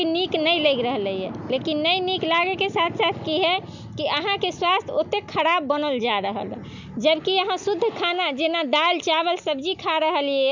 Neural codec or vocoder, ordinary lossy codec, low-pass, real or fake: none; none; 7.2 kHz; real